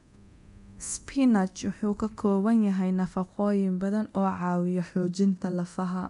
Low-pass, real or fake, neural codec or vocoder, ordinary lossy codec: 10.8 kHz; fake; codec, 24 kHz, 0.9 kbps, DualCodec; none